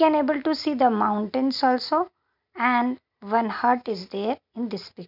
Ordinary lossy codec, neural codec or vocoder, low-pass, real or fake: none; none; 5.4 kHz; real